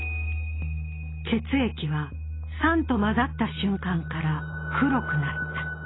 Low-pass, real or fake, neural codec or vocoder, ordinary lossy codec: 7.2 kHz; real; none; AAC, 16 kbps